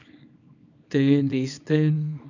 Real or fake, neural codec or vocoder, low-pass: fake; codec, 24 kHz, 0.9 kbps, WavTokenizer, small release; 7.2 kHz